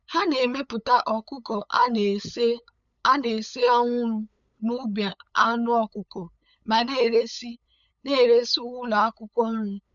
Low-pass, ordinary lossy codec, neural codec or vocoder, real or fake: 7.2 kHz; none; codec, 16 kHz, 8 kbps, FunCodec, trained on LibriTTS, 25 frames a second; fake